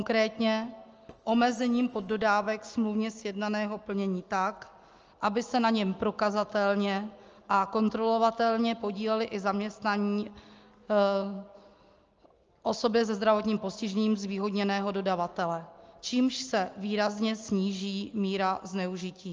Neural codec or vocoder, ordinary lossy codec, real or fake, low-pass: none; Opus, 24 kbps; real; 7.2 kHz